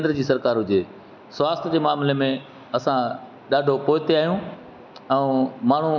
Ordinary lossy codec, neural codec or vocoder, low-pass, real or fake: none; none; 7.2 kHz; real